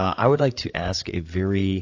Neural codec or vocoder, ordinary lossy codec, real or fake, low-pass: codec, 16 kHz, 8 kbps, FreqCodec, larger model; AAC, 48 kbps; fake; 7.2 kHz